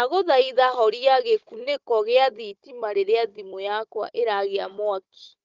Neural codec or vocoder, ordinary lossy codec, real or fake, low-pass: codec, 16 kHz, 16 kbps, FunCodec, trained on Chinese and English, 50 frames a second; Opus, 32 kbps; fake; 7.2 kHz